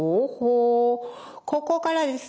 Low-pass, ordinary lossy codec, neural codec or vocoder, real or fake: none; none; none; real